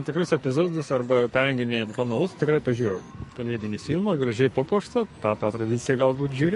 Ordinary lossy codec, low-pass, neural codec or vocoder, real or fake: MP3, 48 kbps; 14.4 kHz; codec, 44.1 kHz, 2.6 kbps, SNAC; fake